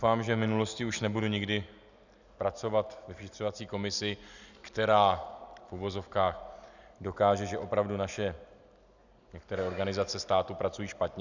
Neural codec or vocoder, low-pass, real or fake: none; 7.2 kHz; real